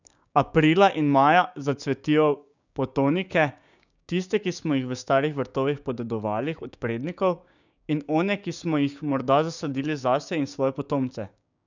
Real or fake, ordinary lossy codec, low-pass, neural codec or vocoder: fake; none; 7.2 kHz; codec, 16 kHz, 6 kbps, DAC